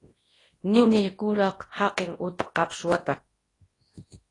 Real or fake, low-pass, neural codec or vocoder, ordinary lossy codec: fake; 10.8 kHz; codec, 24 kHz, 0.9 kbps, WavTokenizer, large speech release; AAC, 32 kbps